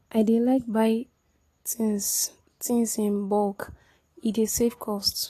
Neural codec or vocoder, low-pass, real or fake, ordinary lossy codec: none; 14.4 kHz; real; AAC, 48 kbps